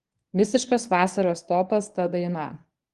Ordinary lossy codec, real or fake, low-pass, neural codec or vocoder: Opus, 24 kbps; fake; 10.8 kHz; codec, 24 kHz, 0.9 kbps, WavTokenizer, medium speech release version 1